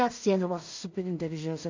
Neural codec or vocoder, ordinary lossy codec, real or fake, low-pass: codec, 16 kHz in and 24 kHz out, 0.4 kbps, LongCat-Audio-Codec, two codebook decoder; MP3, 48 kbps; fake; 7.2 kHz